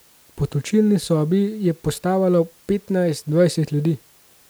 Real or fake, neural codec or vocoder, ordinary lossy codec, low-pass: real; none; none; none